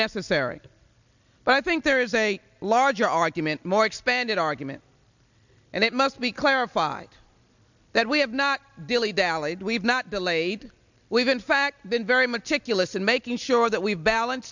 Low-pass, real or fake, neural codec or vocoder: 7.2 kHz; real; none